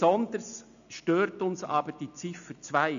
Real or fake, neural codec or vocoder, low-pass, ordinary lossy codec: real; none; 7.2 kHz; none